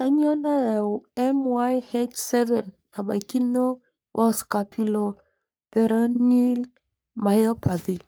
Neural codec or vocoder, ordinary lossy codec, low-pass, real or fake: codec, 44.1 kHz, 3.4 kbps, Pupu-Codec; none; none; fake